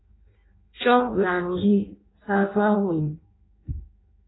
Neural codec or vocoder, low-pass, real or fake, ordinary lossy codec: codec, 16 kHz in and 24 kHz out, 0.6 kbps, FireRedTTS-2 codec; 7.2 kHz; fake; AAC, 16 kbps